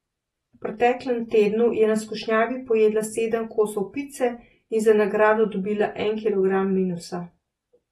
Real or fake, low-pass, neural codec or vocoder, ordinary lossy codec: real; 19.8 kHz; none; AAC, 32 kbps